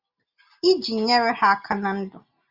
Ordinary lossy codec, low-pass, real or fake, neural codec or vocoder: Opus, 64 kbps; 5.4 kHz; real; none